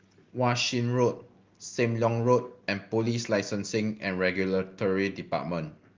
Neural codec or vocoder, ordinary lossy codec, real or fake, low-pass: none; Opus, 32 kbps; real; 7.2 kHz